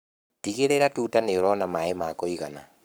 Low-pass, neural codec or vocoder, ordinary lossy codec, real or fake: none; codec, 44.1 kHz, 7.8 kbps, Pupu-Codec; none; fake